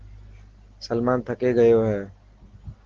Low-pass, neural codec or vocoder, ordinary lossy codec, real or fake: 7.2 kHz; none; Opus, 16 kbps; real